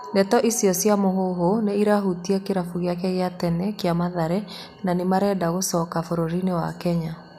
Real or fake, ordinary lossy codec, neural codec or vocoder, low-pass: real; none; none; 14.4 kHz